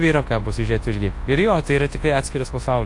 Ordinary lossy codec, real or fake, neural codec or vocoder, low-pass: AAC, 48 kbps; fake; codec, 24 kHz, 0.9 kbps, WavTokenizer, large speech release; 10.8 kHz